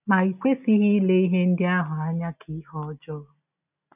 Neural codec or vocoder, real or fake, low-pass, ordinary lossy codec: none; real; 3.6 kHz; none